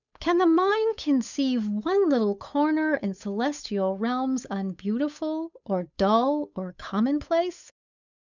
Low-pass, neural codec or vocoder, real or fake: 7.2 kHz; codec, 16 kHz, 8 kbps, FunCodec, trained on Chinese and English, 25 frames a second; fake